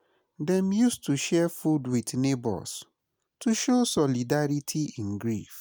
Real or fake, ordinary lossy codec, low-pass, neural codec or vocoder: real; none; none; none